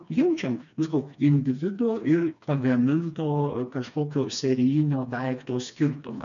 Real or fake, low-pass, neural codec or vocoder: fake; 7.2 kHz; codec, 16 kHz, 2 kbps, FreqCodec, smaller model